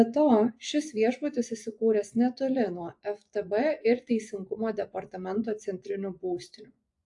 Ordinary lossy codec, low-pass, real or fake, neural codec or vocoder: AAC, 64 kbps; 10.8 kHz; fake; vocoder, 24 kHz, 100 mel bands, Vocos